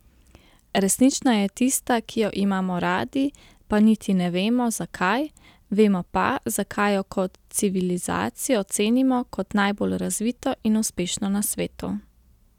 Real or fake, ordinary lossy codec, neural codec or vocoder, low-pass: real; none; none; 19.8 kHz